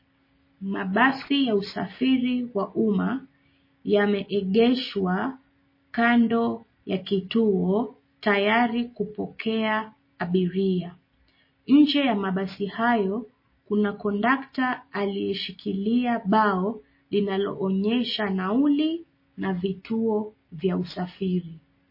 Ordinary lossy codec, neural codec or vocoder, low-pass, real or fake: MP3, 24 kbps; none; 5.4 kHz; real